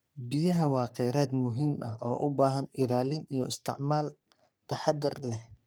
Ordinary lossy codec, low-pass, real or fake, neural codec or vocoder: none; none; fake; codec, 44.1 kHz, 3.4 kbps, Pupu-Codec